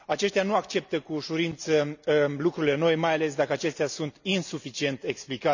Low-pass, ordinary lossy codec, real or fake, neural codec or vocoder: 7.2 kHz; none; real; none